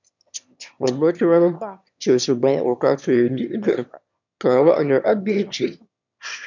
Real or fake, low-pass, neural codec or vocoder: fake; 7.2 kHz; autoencoder, 22.05 kHz, a latent of 192 numbers a frame, VITS, trained on one speaker